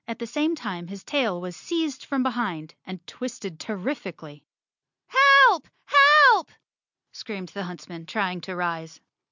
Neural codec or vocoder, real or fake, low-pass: none; real; 7.2 kHz